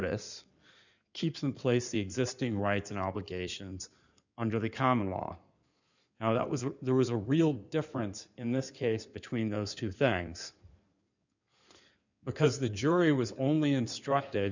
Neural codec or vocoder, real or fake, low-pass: codec, 16 kHz in and 24 kHz out, 2.2 kbps, FireRedTTS-2 codec; fake; 7.2 kHz